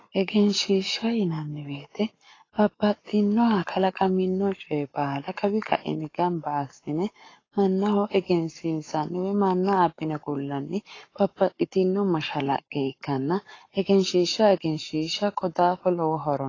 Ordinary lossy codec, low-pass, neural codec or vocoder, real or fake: AAC, 32 kbps; 7.2 kHz; codec, 44.1 kHz, 7.8 kbps, Pupu-Codec; fake